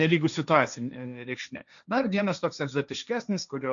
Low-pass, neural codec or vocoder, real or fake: 7.2 kHz; codec, 16 kHz, 1.1 kbps, Voila-Tokenizer; fake